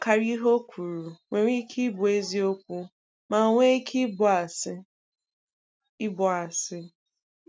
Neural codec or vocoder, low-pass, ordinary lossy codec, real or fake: none; none; none; real